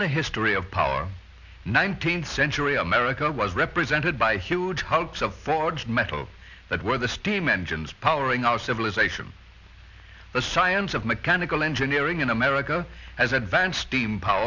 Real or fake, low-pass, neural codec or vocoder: real; 7.2 kHz; none